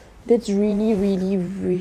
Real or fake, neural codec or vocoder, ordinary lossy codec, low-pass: fake; vocoder, 44.1 kHz, 128 mel bands every 512 samples, BigVGAN v2; none; 14.4 kHz